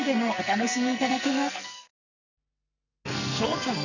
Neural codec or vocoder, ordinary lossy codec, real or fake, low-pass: codec, 44.1 kHz, 2.6 kbps, SNAC; none; fake; 7.2 kHz